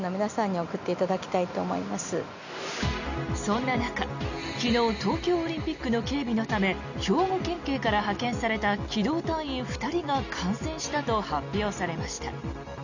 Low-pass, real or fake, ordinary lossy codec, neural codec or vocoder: 7.2 kHz; real; none; none